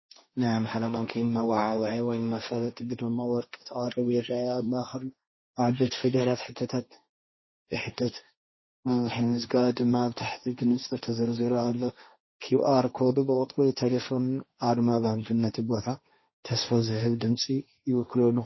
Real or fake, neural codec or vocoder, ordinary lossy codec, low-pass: fake; codec, 16 kHz, 1.1 kbps, Voila-Tokenizer; MP3, 24 kbps; 7.2 kHz